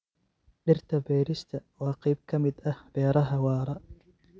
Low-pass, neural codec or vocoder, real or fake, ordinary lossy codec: none; none; real; none